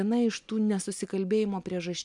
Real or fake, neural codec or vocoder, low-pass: real; none; 10.8 kHz